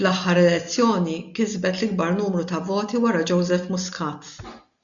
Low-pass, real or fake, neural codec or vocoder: 7.2 kHz; real; none